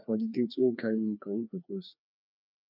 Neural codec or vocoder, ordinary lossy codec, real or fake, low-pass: codec, 16 kHz, 2 kbps, FreqCodec, larger model; none; fake; 5.4 kHz